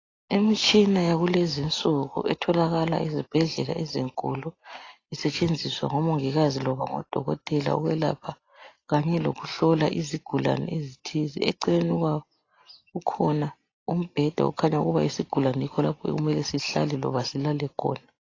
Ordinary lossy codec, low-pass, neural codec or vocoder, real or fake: AAC, 32 kbps; 7.2 kHz; none; real